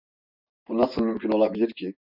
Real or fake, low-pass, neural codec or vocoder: fake; 5.4 kHz; vocoder, 24 kHz, 100 mel bands, Vocos